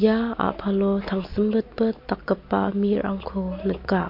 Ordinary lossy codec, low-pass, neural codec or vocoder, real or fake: MP3, 32 kbps; 5.4 kHz; none; real